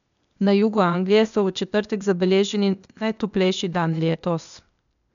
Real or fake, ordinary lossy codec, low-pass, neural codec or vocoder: fake; none; 7.2 kHz; codec, 16 kHz, 0.8 kbps, ZipCodec